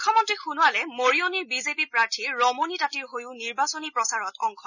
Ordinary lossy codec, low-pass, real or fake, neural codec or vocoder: none; 7.2 kHz; real; none